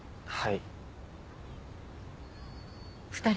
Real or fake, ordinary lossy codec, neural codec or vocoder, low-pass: real; none; none; none